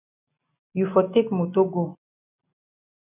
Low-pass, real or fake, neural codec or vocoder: 3.6 kHz; real; none